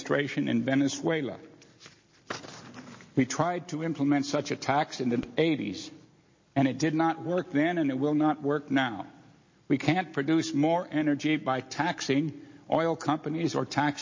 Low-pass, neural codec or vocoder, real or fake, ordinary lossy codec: 7.2 kHz; none; real; MP3, 32 kbps